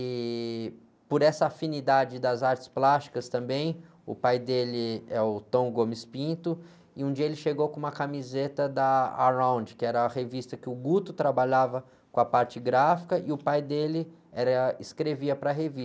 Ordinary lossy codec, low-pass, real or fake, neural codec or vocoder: none; none; real; none